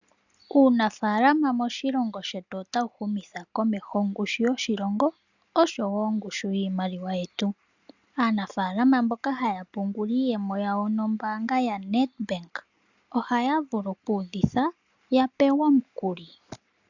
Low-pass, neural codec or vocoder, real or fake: 7.2 kHz; none; real